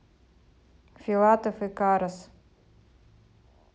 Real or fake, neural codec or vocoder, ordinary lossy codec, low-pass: real; none; none; none